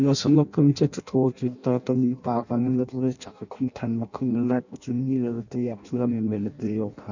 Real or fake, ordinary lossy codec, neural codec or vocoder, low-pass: fake; none; codec, 16 kHz in and 24 kHz out, 0.6 kbps, FireRedTTS-2 codec; 7.2 kHz